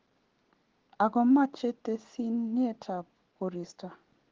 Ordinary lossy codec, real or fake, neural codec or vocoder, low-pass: Opus, 16 kbps; real; none; 7.2 kHz